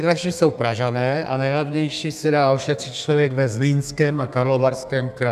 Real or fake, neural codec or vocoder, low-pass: fake; codec, 32 kHz, 1.9 kbps, SNAC; 14.4 kHz